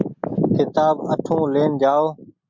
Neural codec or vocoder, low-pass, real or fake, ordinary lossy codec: none; 7.2 kHz; real; MP3, 64 kbps